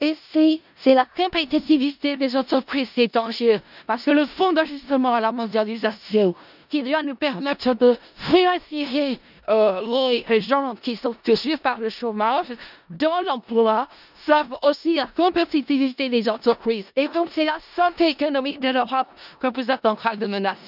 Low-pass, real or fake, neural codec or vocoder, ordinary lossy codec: 5.4 kHz; fake; codec, 16 kHz in and 24 kHz out, 0.4 kbps, LongCat-Audio-Codec, four codebook decoder; none